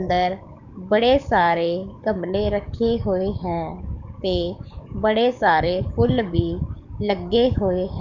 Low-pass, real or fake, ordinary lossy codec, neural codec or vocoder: 7.2 kHz; fake; none; codec, 44.1 kHz, 7.8 kbps, DAC